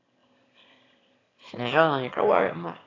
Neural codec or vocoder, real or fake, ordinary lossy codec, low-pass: autoencoder, 22.05 kHz, a latent of 192 numbers a frame, VITS, trained on one speaker; fake; AAC, 32 kbps; 7.2 kHz